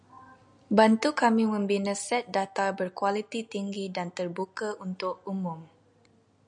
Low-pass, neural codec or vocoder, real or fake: 9.9 kHz; none; real